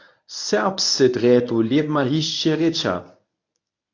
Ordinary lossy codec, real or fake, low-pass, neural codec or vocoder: AAC, 48 kbps; fake; 7.2 kHz; codec, 24 kHz, 0.9 kbps, WavTokenizer, medium speech release version 1